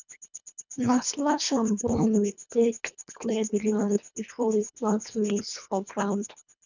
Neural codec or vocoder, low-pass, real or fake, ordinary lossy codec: codec, 24 kHz, 1.5 kbps, HILCodec; 7.2 kHz; fake; none